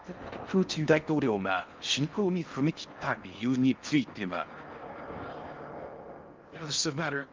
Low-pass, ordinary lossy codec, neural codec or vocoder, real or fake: 7.2 kHz; Opus, 24 kbps; codec, 16 kHz in and 24 kHz out, 0.6 kbps, FocalCodec, streaming, 4096 codes; fake